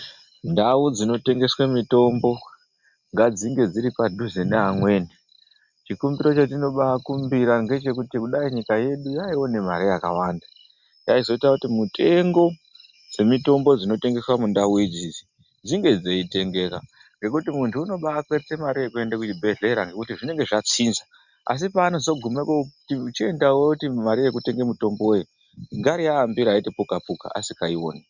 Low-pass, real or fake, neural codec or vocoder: 7.2 kHz; real; none